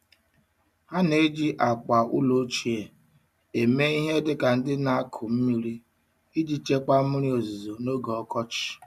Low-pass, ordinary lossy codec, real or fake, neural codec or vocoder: 14.4 kHz; none; real; none